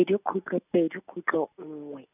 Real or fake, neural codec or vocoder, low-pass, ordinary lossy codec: real; none; 3.6 kHz; none